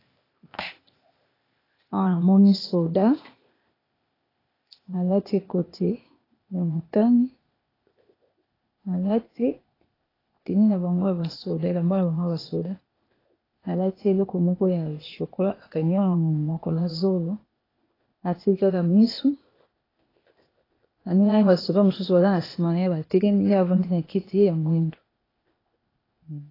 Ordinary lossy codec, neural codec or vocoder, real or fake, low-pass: AAC, 24 kbps; codec, 16 kHz, 0.8 kbps, ZipCodec; fake; 5.4 kHz